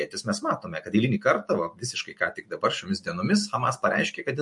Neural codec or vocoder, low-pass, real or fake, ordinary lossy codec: none; 10.8 kHz; real; MP3, 48 kbps